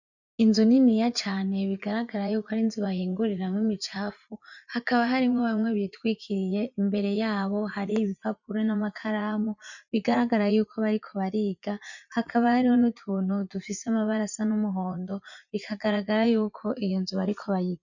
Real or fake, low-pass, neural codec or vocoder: fake; 7.2 kHz; vocoder, 44.1 kHz, 80 mel bands, Vocos